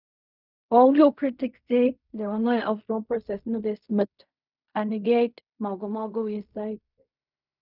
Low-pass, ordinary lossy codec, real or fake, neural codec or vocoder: 5.4 kHz; none; fake; codec, 16 kHz in and 24 kHz out, 0.4 kbps, LongCat-Audio-Codec, fine tuned four codebook decoder